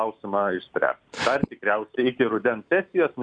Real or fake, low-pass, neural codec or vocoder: real; 9.9 kHz; none